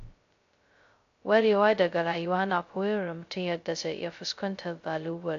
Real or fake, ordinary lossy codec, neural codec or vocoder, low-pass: fake; AAC, 48 kbps; codec, 16 kHz, 0.2 kbps, FocalCodec; 7.2 kHz